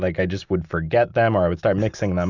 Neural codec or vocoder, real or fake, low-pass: none; real; 7.2 kHz